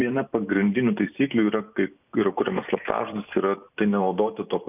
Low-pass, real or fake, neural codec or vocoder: 3.6 kHz; fake; vocoder, 44.1 kHz, 128 mel bands every 256 samples, BigVGAN v2